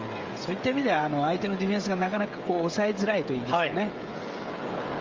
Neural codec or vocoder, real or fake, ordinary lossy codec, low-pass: codec, 16 kHz, 16 kbps, FreqCodec, smaller model; fake; Opus, 32 kbps; 7.2 kHz